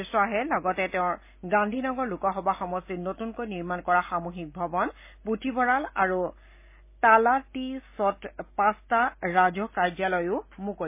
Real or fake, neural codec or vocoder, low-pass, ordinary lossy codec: real; none; 3.6 kHz; MP3, 24 kbps